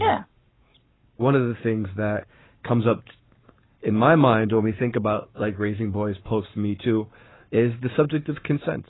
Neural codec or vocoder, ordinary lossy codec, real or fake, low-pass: codec, 44.1 kHz, 7.8 kbps, Pupu-Codec; AAC, 16 kbps; fake; 7.2 kHz